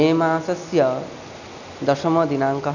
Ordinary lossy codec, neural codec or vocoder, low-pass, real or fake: none; none; 7.2 kHz; real